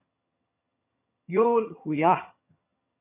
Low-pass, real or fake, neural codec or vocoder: 3.6 kHz; fake; vocoder, 22.05 kHz, 80 mel bands, HiFi-GAN